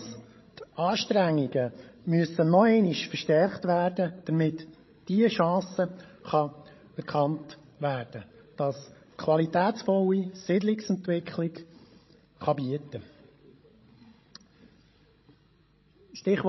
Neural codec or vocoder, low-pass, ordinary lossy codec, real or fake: codec, 16 kHz, 16 kbps, FreqCodec, larger model; 7.2 kHz; MP3, 24 kbps; fake